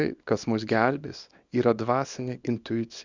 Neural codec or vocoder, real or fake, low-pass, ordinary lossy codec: codec, 24 kHz, 0.9 kbps, WavTokenizer, small release; fake; 7.2 kHz; Opus, 64 kbps